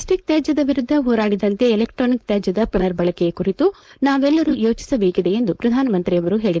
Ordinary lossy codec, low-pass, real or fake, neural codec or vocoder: none; none; fake; codec, 16 kHz, 4.8 kbps, FACodec